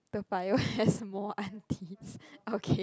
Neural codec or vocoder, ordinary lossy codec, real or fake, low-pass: none; none; real; none